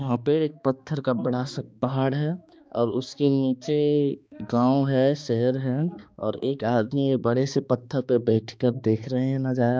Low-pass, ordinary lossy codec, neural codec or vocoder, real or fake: none; none; codec, 16 kHz, 2 kbps, X-Codec, HuBERT features, trained on balanced general audio; fake